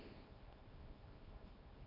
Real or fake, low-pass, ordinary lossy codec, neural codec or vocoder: fake; 5.4 kHz; none; codec, 24 kHz, 0.9 kbps, WavTokenizer, small release